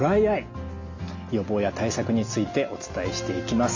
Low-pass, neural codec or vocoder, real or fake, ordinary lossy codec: 7.2 kHz; none; real; AAC, 48 kbps